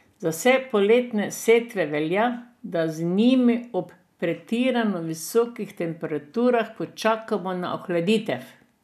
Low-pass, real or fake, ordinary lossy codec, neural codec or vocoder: 14.4 kHz; real; none; none